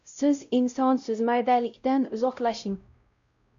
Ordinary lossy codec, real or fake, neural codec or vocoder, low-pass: MP3, 64 kbps; fake; codec, 16 kHz, 0.5 kbps, X-Codec, WavLM features, trained on Multilingual LibriSpeech; 7.2 kHz